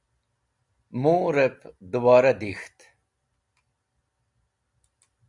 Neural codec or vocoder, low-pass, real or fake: none; 10.8 kHz; real